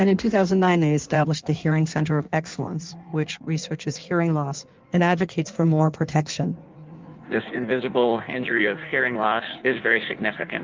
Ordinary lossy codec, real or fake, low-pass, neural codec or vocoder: Opus, 32 kbps; fake; 7.2 kHz; codec, 16 kHz in and 24 kHz out, 1.1 kbps, FireRedTTS-2 codec